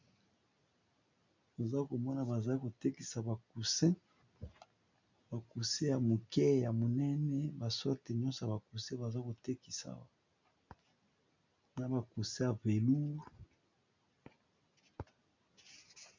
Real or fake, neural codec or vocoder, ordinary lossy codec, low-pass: real; none; MP3, 64 kbps; 7.2 kHz